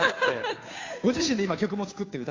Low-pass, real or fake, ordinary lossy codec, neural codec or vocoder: 7.2 kHz; fake; AAC, 32 kbps; codec, 16 kHz, 2 kbps, FunCodec, trained on Chinese and English, 25 frames a second